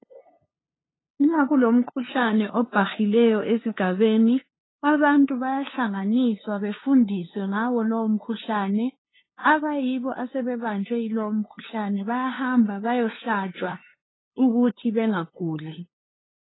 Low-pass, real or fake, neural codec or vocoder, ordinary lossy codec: 7.2 kHz; fake; codec, 16 kHz, 2 kbps, FunCodec, trained on LibriTTS, 25 frames a second; AAC, 16 kbps